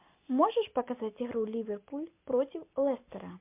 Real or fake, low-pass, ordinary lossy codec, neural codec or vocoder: real; 3.6 kHz; MP3, 32 kbps; none